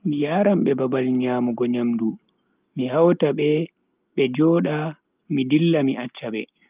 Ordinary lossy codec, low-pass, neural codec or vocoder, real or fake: Opus, 24 kbps; 3.6 kHz; none; real